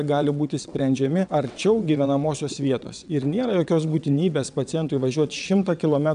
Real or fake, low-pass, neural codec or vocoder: fake; 9.9 kHz; vocoder, 22.05 kHz, 80 mel bands, WaveNeXt